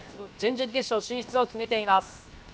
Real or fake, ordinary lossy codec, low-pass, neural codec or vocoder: fake; none; none; codec, 16 kHz, 0.7 kbps, FocalCodec